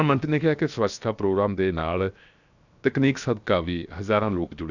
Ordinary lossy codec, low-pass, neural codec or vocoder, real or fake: none; 7.2 kHz; codec, 16 kHz, 0.7 kbps, FocalCodec; fake